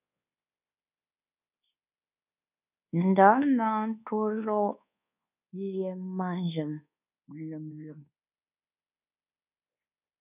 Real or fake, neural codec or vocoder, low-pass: fake; codec, 24 kHz, 1.2 kbps, DualCodec; 3.6 kHz